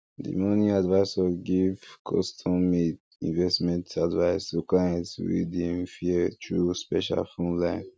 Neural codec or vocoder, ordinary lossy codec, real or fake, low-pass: none; none; real; none